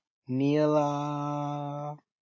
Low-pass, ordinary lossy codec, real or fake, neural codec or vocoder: 7.2 kHz; AAC, 48 kbps; real; none